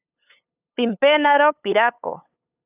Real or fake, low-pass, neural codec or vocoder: fake; 3.6 kHz; codec, 16 kHz, 8 kbps, FunCodec, trained on LibriTTS, 25 frames a second